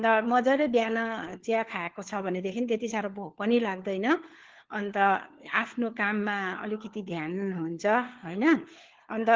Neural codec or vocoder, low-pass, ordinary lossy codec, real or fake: codec, 16 kHz, 4 kbps, FunCodec, trained on LibriTTS, 50 frames a second; 7.2 kHz; Opus, 16 kbps; fake